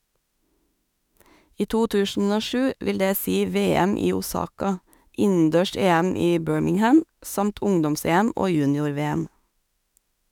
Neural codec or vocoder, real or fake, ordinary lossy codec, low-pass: autoencoder, 48 kHz, 32 numbers a frame, DAC-VAE, trained on Japanese speech; fake; none; 19.8 kHz